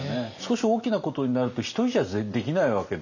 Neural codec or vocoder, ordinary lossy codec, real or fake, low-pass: none; none; real; 7.2 kHz